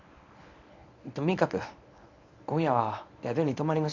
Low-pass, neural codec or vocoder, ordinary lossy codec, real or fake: 7.2 kHz; codec, 24 kHz, 0.9 kbps, WavTokenizer, medium speech release version 1; none; fake